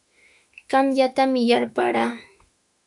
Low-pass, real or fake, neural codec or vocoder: 10.8 kHz; fake; autoencoder, 48 kHz, 32 numbers a frame, DAC-VAE, trained on Japanese speech